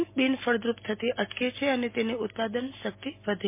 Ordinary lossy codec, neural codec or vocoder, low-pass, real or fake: MP3, 24 kbps; none; 3.6 kHz; real